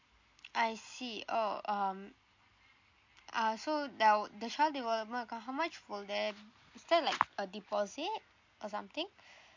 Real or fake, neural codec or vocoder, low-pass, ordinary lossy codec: real; none; 7.2 kHz; MP3, 48 kbps